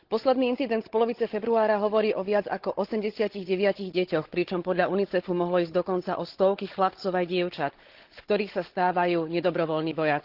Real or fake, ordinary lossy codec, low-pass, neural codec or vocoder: fake; Opus, 16 kbps; 5.4 kHz; codec, 16 kHz, 16 kbps, FunCodec, trained on Chinese and English, 50 frames a second